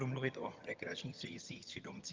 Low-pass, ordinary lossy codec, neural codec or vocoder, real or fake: 7.2 kHz; Opus, 32 kbps; vocoder, 22.05 kHz, 80 mel bands, HiFi-GAN; fake